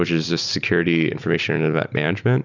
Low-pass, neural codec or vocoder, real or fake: 7.2 kHz; none; real